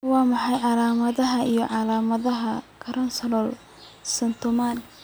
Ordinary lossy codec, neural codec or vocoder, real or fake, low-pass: none; none; real; none